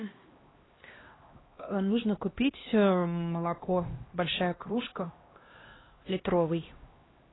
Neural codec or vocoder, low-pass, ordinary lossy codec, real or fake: codec, 16 kHz, 1 kbps, X-Codec, HuBERT features, trained on LibriSpeech; 7.2 kHz; AAC, 16 kbps; fake